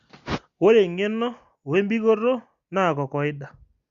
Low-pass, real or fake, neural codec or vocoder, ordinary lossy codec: 7.2 kHz; real; none; Opus, 64 kbps